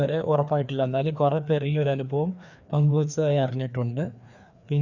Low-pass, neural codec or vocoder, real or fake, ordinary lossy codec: 7.2 kHz; codec, 16 kHz, 2 kbps, FreqCodec, larger model; fake; none